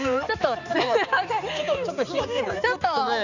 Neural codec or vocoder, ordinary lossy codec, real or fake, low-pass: codec, 16 kHz, 4 kbps, X-Codec, HuBERT features, trained on balanced general audio; none; fake; 7.2 kHz